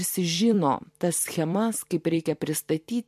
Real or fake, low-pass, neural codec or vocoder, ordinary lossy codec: fake; 14.4 kHz; vocoder, 44.1 kHz, 128 mel bands every 256 samples, BigVGAN v2; MP3, 64 kbps